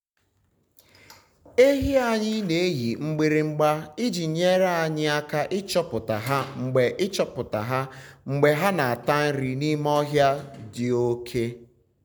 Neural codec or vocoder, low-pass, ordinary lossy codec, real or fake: none; none; none; real